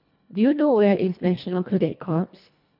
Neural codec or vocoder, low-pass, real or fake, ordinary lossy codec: codec, 24 kHz, 1.5 kbps, HILCodec; 5.4 kHz; fake; none